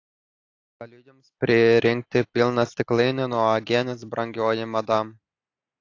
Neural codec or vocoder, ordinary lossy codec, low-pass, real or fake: none; AAC, 48 kbps; 7.2 kHz; real